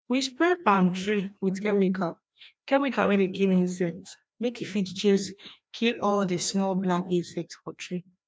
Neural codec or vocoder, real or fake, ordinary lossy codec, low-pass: codec, 16 kHz, 1 kbps, FreqCodec, larger model; fake; none; none